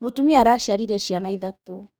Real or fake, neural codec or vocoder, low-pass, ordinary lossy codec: fake; codec, 44.1 kHz, 2.6 kbps, DAC; none; none